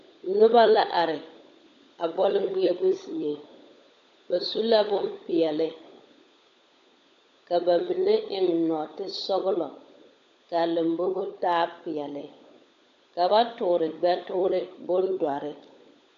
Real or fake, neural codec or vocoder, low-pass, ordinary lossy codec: fake; codec, 16 kHz, 16 kbps, FunCodec, trained on LibriTTS, 50 frames a second; 7.2 kHz; AAC, 64 kbps